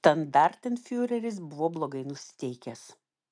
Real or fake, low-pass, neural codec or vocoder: real; 9.9 kHz; none